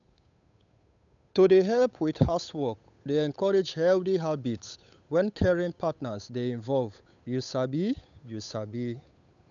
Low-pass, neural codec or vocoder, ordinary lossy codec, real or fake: 7.2 kHz; codec, 16 kHz, 8 kbps, FunCodec, trained on Chinese and English, 25 frames a second; none; fake